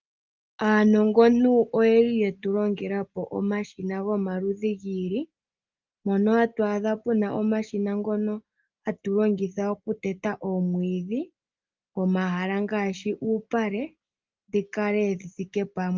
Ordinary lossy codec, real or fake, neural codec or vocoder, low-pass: Opus, 24 kbps; real; none; 7.2 kHz